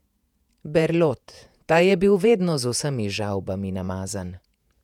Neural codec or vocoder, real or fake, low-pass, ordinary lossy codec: vocoder, 48 kHz, 128 mel bands, Vocos; fake; 19.8 kHz; none